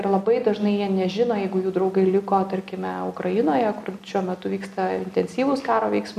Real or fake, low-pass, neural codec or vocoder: real; 14.4 kHz; none